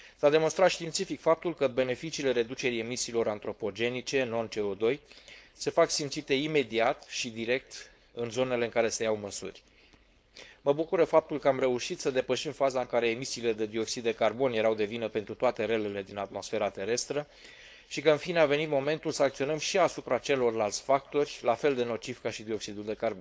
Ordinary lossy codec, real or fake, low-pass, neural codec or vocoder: none; fake; none; codec, 16 kHz, 4.8 kbps, FACodec